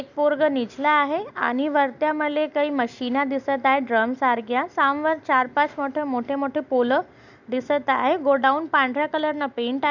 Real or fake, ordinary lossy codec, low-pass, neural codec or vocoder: real; none; 7.2 kHz; none